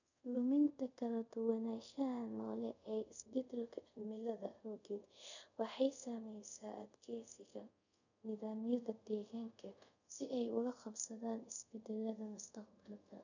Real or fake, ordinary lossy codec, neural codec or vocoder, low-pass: fake; none; codec, 24 kHz, 0.5 kbps, DualCodec; 7.2 kHz